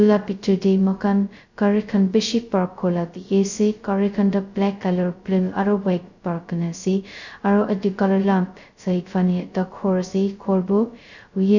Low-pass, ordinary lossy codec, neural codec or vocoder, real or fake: 7.2 kHz; none; codec, 16 kHz, 0.2 kbps, FocalCodec; fake